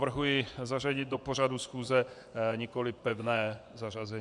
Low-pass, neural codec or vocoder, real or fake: 10.8 kHz; vocoder, 24 kHz, 100 mel bands, Vocos; fake